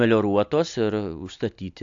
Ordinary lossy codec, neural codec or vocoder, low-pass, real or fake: AAC, 64 kbps; none; 7.2 kHz; real